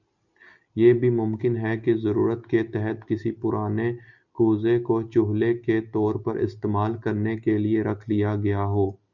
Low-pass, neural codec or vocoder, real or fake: 7.2 kHz; none; real